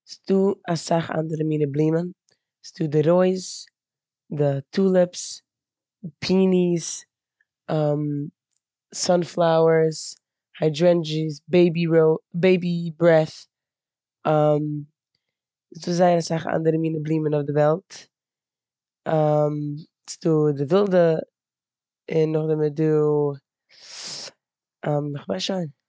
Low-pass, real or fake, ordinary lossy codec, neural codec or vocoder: none; real; none; none